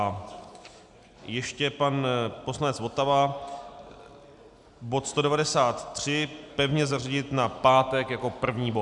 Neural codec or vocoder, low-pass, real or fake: none; 10.8 kHz; real